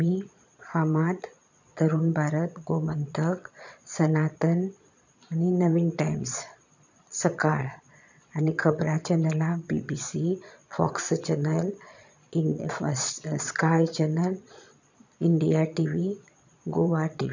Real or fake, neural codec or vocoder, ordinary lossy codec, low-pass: fake; vocoder, 22.05 kHz, 80 mel bands, Vocos; none; 7.2 kHz